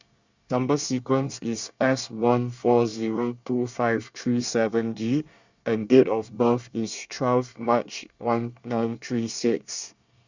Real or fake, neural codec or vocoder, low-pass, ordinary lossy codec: fake; codec, 24 kHz, 1 kbps, SNAC; 7.2 kHz; Opus, 64 kbps